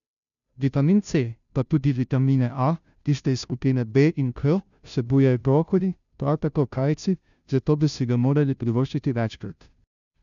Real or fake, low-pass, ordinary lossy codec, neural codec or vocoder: fake; 7.2 kHz; none; codec, 16 kHz, 0.5 kbps, FunCodec, trained on Chinese and English, 25 frames a second